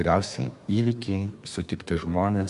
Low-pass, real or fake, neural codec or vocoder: 10.8 kHz; fake; codec, 24 kHz, 1 kbps, SNAC